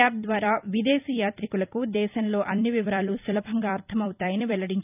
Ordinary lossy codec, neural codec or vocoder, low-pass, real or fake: none; vocoder, 44.1 kHz, 128 mel bands every 256 samples, BigVGAN v2; 3.6 kHz; fake